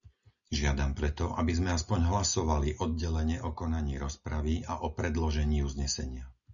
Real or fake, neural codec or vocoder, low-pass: real; none; 7.2 kHz